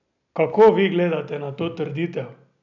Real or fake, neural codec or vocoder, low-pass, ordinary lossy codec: real; none; 7.2 kHz; none